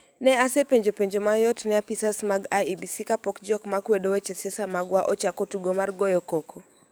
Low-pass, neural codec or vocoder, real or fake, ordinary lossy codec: none; codec, 44.1 kHz, 7.8 kbps, DAC; fake; none